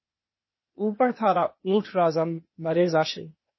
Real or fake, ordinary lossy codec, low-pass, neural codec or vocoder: fake; MP3, 24 kbps; 7.2 kHz; codec, 16 kHz, 0.8 kbps, ZipCodec